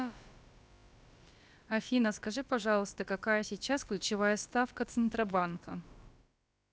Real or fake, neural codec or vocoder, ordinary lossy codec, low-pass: fake; codec, 16 kHz, about 1 kbps, DyCAST, with the encoder's durations; none; none